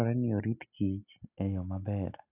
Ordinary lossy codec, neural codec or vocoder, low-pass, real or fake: none; none; 3.6 kHz; real